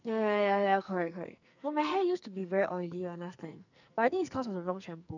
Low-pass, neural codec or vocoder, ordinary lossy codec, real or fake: 7.2 kHz; codec, 44.1 kHz, 2.6 kbps, SNAC; none; fake